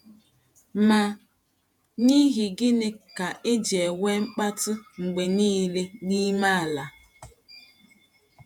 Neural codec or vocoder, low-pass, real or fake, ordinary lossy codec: vocoder, 48 kHz, 128 mel bands, Vocos; 19.8 kHz; fake; none